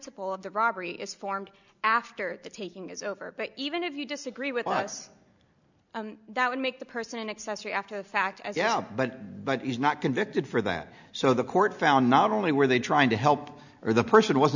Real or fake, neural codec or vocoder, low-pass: real; none; 7.2 kHz